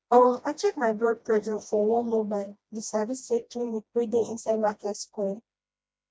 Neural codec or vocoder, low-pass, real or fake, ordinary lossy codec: codec, 16 kHz, 1 kbps, FreqCodec, smaller model; none; fake; none